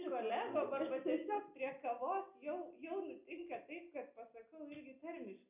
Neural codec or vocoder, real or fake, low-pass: none; real; 3.6 kHz